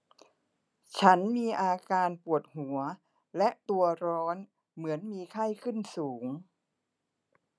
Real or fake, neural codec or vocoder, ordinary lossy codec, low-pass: real; none; none; none